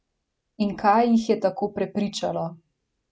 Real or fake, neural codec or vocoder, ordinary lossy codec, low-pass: real; none; none; none